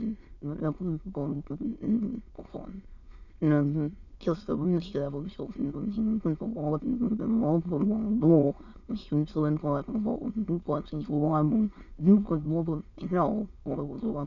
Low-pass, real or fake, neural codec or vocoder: 7.2 kHz; fake; autoencoder, 22.05 kHz, a latent of 192 numbers a frame, VITS, trained on many speakers